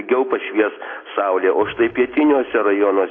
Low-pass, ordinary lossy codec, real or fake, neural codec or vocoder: 7.2 kHz; AAC, 32 kbps; real; none